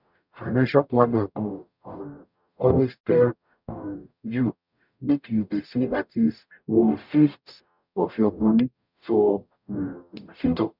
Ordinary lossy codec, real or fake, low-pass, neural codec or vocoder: none; fake; 5.4 kHz; codec, 44.1 kHz, 0.9 kbps, DAC